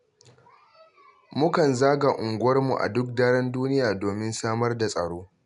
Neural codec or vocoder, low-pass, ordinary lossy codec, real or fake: none; 10.8 kHz; MP3, 96 kbps; real